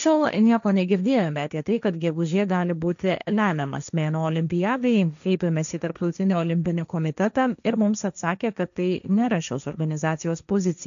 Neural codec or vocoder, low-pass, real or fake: codec, 16 kHz, 1.1 kbps, Voila-Tokenizer; 7.2 kHz; fake